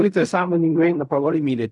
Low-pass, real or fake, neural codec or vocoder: 10.8 kHz; fake; codec, 16 kHz in and 24 kHz out, 0.4 kbps, LongCat-Audio-Codec, fine tuned four codebook decoder